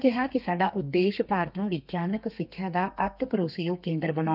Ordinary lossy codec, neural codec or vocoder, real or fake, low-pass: none; codec, 32 kHz, 1.9 kbps, SNAC; fake; 5.4 kHz